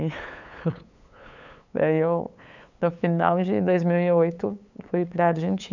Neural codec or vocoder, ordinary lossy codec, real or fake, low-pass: codec, 16 kHz, 8 kbps, FunCodec, trained on LibriTTS, 25 frames a second; none; fake; 7.2 kHz